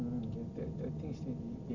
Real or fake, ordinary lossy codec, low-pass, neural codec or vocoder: real; none; 7.2 kHz; none